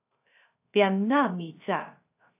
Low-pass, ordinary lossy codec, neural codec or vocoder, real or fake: 3.6 kHz; AAC, 24 kbps; codec, 16 kHz, 0.3 kbps, FocalCodec; fake